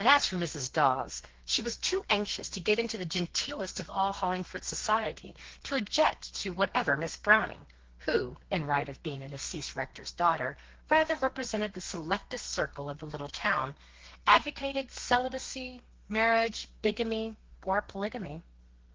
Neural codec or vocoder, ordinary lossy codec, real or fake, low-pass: codec, 32 kHz, 1.9 kbps, SNAC; Opus, 16 kbps; fake; 7.2 kHz